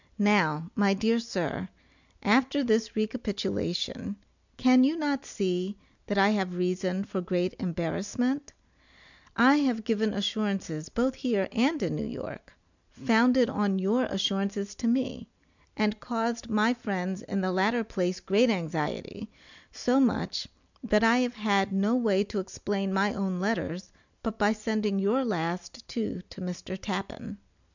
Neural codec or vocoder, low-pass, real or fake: none; 7.2 kHz; real